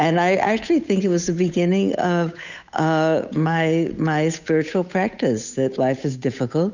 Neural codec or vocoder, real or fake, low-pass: codec, 16 kHz, 8 kbps, FunCodec, trained on Chinese and English, 25 frames a second; fake; 7.2 kHz